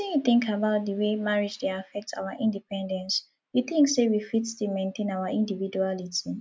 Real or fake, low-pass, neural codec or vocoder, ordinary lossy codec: real; none; none; none